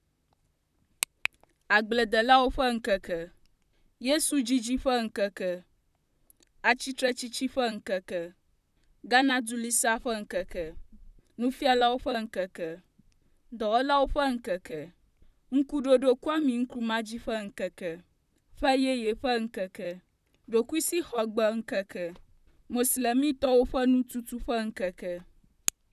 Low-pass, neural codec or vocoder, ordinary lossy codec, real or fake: 14.4 kHz; vocoder, 44.1 kHz, 128 mel bands, Pupu-Vocoder; none; fake